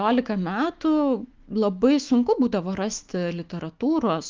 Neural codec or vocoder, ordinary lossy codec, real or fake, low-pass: autoencoder, 48 kHz, 128 numbers a frame, DAC-VAE, trained on Japanese speech; Opus, 32 kbps; fake; 7.2 kHz